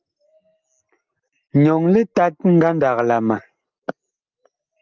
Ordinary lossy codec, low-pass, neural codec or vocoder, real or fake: Opus, 24 kbps; 7.2 kHz; none; real